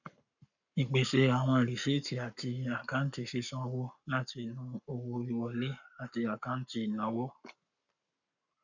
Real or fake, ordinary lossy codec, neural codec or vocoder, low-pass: fake; none; codec, 44.1 kHz, 7.8 kbps, Pupu-Codec; 7.2 kHz